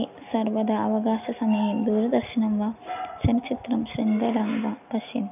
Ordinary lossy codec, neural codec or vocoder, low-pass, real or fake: none; none; 3.6 kHz; real